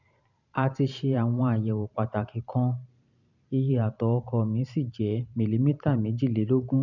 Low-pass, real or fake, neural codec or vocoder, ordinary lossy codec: 7.2 kHz; fake; vocoder, 44.1 kHz, 128 mel bands every 512 samples, BigVGAN v2; none